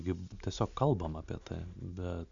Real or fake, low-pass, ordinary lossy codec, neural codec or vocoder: real; 7.2 kHz; AAC, 64 kbps; none